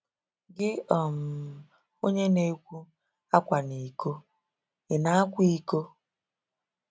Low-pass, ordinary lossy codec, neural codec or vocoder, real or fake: none; none; none; real